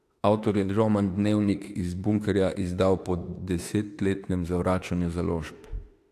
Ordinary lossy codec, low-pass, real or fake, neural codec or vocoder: AAC, 64 kbps; 14.4 kHz; fake; autoencoder, 48 kHz, 32 numbers a frame, DAC-VAE, trained on Japanese speech